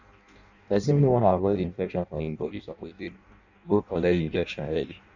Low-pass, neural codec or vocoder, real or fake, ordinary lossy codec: 7.2 kHz; codec, 16 kHz in and 24 kHz out, 0.6 kbps, FireRedTTS-2 codec; fake; none